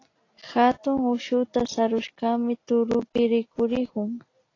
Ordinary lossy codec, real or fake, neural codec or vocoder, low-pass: AAC, 32 kbps; real; none; 7.2 kHz